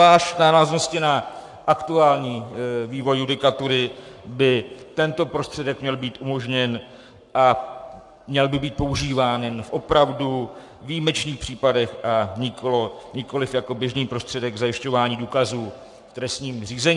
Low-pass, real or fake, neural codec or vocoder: 10.8 kHz; fake; codec, 44.1 kHz, 7.8 kbps, Pupu-Codec